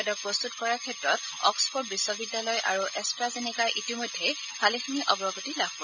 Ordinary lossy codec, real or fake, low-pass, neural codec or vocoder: none; real; 7.2 kHz; none